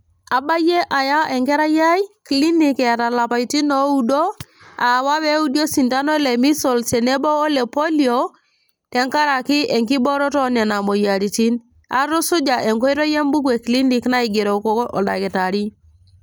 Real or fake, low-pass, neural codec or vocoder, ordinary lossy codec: real; none; none; none